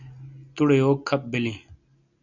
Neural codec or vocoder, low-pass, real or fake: none; 7.2 kHz; real